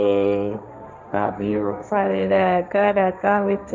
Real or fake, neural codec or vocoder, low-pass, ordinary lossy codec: fake; codec, 16 kHz, 1.1 kbps, Voila-Tokenizer; none; none